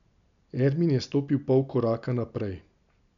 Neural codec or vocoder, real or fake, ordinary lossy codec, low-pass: none; real; none; 7.2 kHz